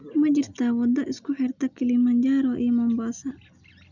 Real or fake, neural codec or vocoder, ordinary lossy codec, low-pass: real; none; none; 7.2 kHz